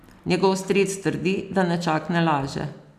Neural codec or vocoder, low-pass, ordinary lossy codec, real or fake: none; 14.4 kHz; none; real